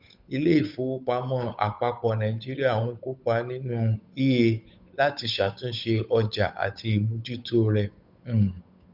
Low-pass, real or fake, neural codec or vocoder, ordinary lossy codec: 5.4 kHz; fake; codec, 16 kHz, 8 kbps, FunCodec, trained on Chinese and English, 25 frames a second; none